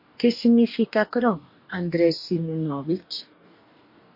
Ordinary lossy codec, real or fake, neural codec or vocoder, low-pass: MP3, 32 kbps; fake; codec, 44.1 kHz, 2.6 kbps, DAC; 5.4 kHz